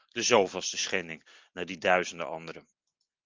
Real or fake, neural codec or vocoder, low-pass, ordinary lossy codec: real; none; 7.2 kHz; Opus, 24 kbps